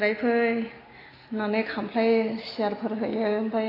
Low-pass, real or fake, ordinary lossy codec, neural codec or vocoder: 5.4 kHz; real; AAC, 24 kbps; none